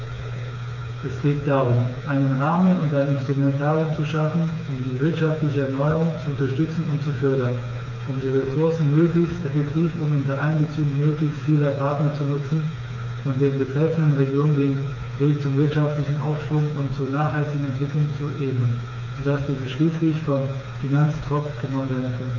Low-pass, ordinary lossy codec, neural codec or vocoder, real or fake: 7.2 kHz; none; codec, 16 kHz, 4 kbps, FreqCodec, smaller model; fake